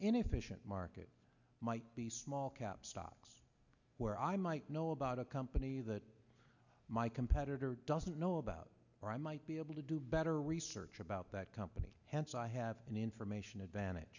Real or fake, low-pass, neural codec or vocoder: real; 7.2 kHz; none